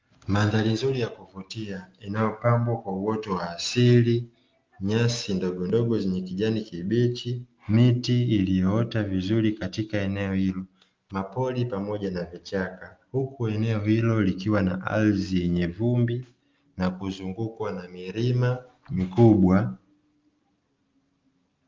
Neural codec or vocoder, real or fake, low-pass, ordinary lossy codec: none; real; 7.2 kHz; Opus, 32 kbps